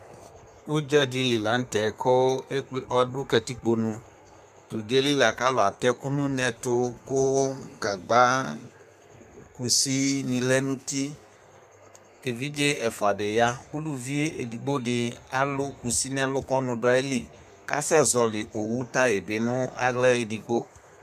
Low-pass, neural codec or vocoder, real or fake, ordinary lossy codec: 14.4 kHz; codec, 32 kHz, 1.9 kbps, SNAC; fake; MP3, 96 kbps